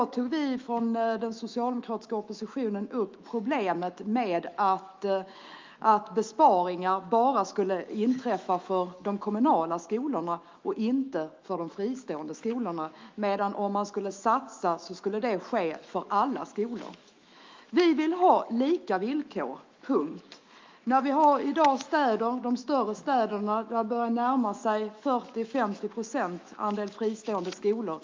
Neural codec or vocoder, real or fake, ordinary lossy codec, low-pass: autoencoder, 48 kHz, 128 numbers a frame, DAC-VAE, trained on Japanese speech; fake; Opus, 24 kbps; 7.2 kHz